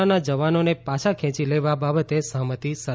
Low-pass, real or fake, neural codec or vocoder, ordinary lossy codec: none; real; none; none